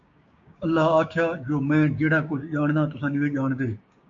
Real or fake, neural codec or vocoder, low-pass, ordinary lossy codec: fake; codec, 16 kHz, 6 kbps, DAC; 7.2 kHz; AAC, 64 kbps